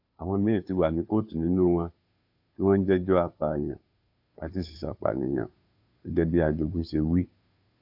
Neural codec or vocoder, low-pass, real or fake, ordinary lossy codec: codec, 16 kHz, 2 kbps, FunCodec, trained on Chinese and English, 25 frames a second; 5.4 kHz; fake; AAC, 48 kbps